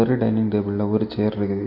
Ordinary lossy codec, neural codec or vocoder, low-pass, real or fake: MP3, 32 kbps; none; 5.4 kHz; real